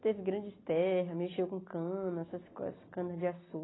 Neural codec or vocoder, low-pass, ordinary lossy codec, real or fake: none; 7.2 kHz; AAC, 16 kbps; real